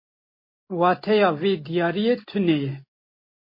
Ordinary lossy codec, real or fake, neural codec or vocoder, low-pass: MP3, 24 kbps; real; none; 5.4 kHz